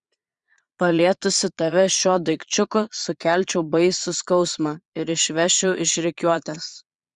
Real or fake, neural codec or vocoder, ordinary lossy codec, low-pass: fake; vocoder, 22.05 kHz, 80 mel bands, Vocos; Opus, 64 kbps; 9.9 kHz